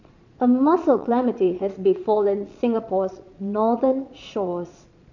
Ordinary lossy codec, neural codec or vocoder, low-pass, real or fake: none; vocoder, 22.05 kHz, 80 mel bands, Vocos; 7.2 kHz; fake